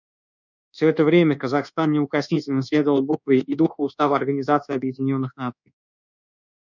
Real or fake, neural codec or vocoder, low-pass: fake; codec, 24 kHz, 1.2 kbps, DualCodec; 7.2 kHz